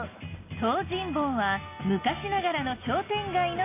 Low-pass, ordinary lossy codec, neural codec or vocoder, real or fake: 3.6 kHz; AAC, 24 kbps; none; real